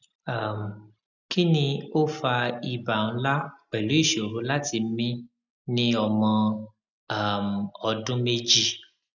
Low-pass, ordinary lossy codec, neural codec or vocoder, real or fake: 7.2 kHz; none; none; real